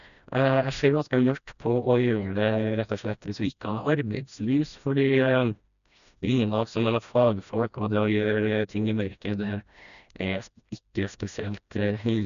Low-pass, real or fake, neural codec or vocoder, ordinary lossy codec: 7.2 kHz; fake; codec, 16 kHz, 1 kbps, FreqCodec, smaller model; none